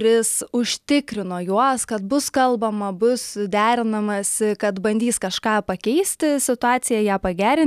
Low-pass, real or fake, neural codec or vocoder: 14.4 kHz; real; none